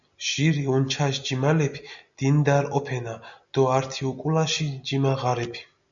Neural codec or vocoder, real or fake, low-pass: none; real; 7.2 kHz